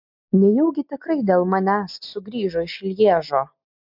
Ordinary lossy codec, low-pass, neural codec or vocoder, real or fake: MP3, 48 kbps; 5.4 kHz; none; real